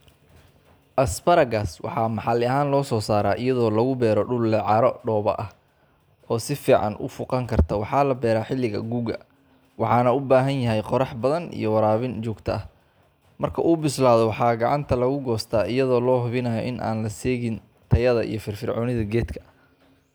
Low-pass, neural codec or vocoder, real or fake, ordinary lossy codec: none; none; real; none